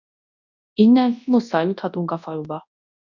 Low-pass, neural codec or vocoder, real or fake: 7.2 kHz; codec, 24 kHz, 0.9 kbps, WavTokenizer, large speech release; fake